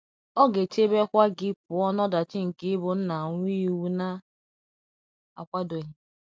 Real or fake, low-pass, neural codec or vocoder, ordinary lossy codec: real; none; none; none